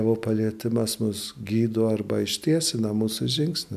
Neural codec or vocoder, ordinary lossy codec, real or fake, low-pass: none; MP3, 96 kbps; real; 14.4 kHz